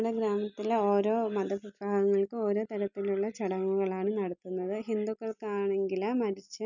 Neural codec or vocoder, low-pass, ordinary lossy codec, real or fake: none; 7.2 kHz; none; real